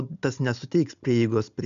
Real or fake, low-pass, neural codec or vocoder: real; 7.2 kHz; none